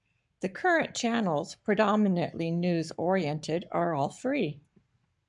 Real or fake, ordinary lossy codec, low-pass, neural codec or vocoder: fake; MP3, 96 kbps; 10.8 kHz; codec, 44.1 kHz, 7.8 kbps, Pupu-Codec